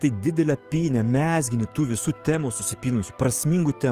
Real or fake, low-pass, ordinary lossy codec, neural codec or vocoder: fake; 14.4 kHz; Opus, 24 kbps; codec, 44.1 kHz, 7.8 kbps, DAC